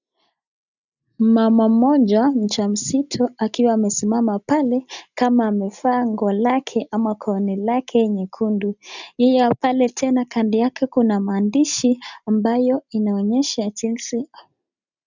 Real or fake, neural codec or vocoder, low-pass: real; none; 7.2 kHz